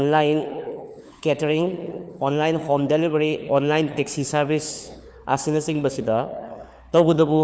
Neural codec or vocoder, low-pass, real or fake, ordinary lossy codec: codec, 16 kHz, 4 kbps, FunCodec, trained on LibriTTS, 50 frames a second; none; fake; none